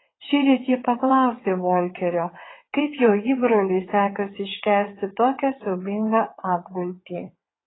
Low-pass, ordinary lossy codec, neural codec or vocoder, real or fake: 7.2 kHz; AAC, 16 kbps; vocoder, 22.05 kHz, 80 mel bands, WaveNeXt; fake